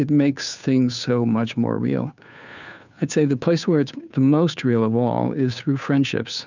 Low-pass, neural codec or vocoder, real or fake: 7.2 kHz; codec, 16 kHz, 2 kbps, FunCodec, trained on Chinese and English, 25 frames a second; fake